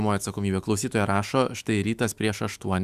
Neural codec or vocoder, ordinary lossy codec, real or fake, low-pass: autoencoder, 48 kHz, 128 numbers a frame, DAC-VAE, trained on Japanese speech; Opus, 64 kbps; fake; 14.4 kHz